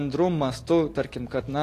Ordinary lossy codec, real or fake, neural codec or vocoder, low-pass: AAC, 48 kbps; real; none; 14.4 kHz